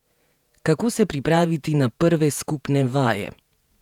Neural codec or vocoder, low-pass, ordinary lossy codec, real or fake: vocoder, 48 kHz, 128 mel bands, Vocos; 19.8 kHz; none; fake